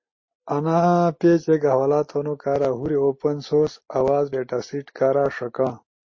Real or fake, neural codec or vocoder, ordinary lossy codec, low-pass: real; none; MP3, 32 kbps; 7.2 kHz